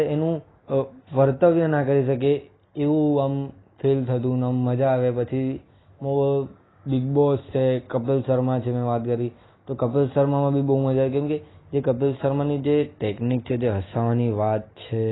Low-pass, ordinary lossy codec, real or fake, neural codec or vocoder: 7.2 kHz; AAC, 16 kbps; real; none